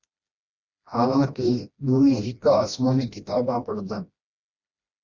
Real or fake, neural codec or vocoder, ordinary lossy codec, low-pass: fake; codec, 16 kHz, 1 kbps, FreqCodec, smaller model; Opus, 64 kbps; 7.2 kHz